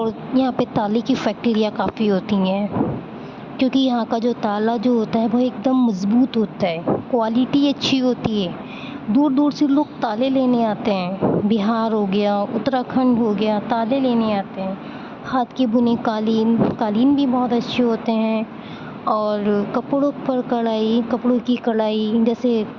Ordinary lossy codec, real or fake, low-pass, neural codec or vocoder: Opus, 64 kbps; real; 7.2 kHz; none